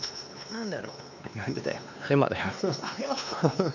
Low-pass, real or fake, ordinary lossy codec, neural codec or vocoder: 7.2 kHz; fake; none; codec, 16 kHz, 2 kbps, X-Codec, WavLM features, trained on Multilingual LibriSpeech